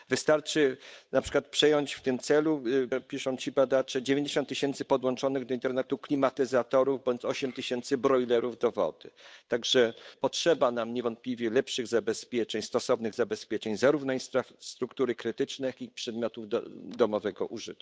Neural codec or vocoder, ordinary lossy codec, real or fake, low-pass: codec, 16 kHz, 8 kbps, FunCodec, trained on Chinese and English, 25 frames a second; none; fake; none